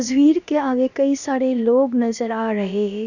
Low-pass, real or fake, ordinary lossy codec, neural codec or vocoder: 7.2 kHz; fake; none; codec, 16 kHz, about 1 kbps, DyCAST, with the encoder's durations